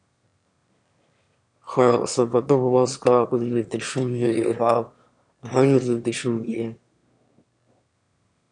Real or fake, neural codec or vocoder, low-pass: fake; autoencoder, 22.05 kHz, a latent of 192 numbers a frame, VITS, trained on one speaker; 9.9 kHz